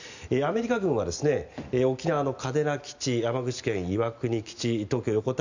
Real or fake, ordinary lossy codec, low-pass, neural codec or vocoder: real; Opus, 64 kbps; 7.2 kHz; none